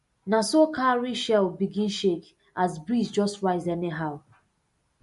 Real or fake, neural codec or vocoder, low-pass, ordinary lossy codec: real; none; 14.4 kHz; MP3, 48 kbps